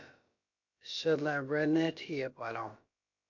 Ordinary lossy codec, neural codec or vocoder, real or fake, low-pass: MP3, 48 kbps; codec, 16 kHz, about 1 kbps, DyCAST, with the encoder's durations; fake; 7.2 kHz